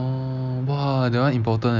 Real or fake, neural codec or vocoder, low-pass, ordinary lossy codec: real; none; 7.2 kHz; none